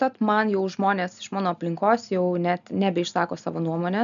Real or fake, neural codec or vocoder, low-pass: real; none; 7.2 kHz